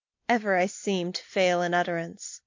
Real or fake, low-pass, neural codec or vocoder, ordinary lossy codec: real; 7.2 kHz; none; MP3, 48 kbps